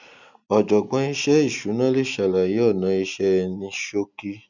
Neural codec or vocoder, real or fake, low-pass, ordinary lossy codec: none; real; 7.2 kHz; none